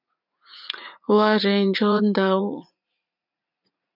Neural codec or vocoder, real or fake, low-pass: vocoder, 44.1 kHz, 80 mel bands, Vocos; fake; 5.4 kHz